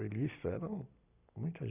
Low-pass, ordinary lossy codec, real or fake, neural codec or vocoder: 3.6 kHz; none; real; none